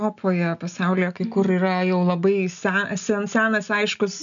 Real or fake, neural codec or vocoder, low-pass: real; none; 7.2 kHz